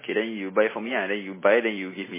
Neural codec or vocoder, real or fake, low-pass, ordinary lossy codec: none; real; 3.6 kHz; MP3, 16 kbps